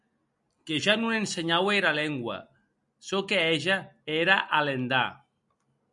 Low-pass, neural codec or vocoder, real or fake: 10.8 kHz; none; real